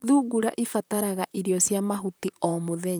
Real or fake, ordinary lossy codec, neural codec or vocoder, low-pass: fake; none; vocoder, 44.1 kHz, 128 mel bands, Pupu-Vocoder; none